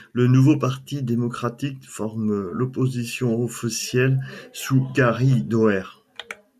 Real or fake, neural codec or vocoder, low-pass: real; none; 14.4 kHz